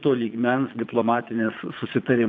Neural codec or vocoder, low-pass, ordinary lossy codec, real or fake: vocoder, 22.05 kHz, 80 mel bands, Vocos; 7.2 kHz; AAC, 48 kbps; fake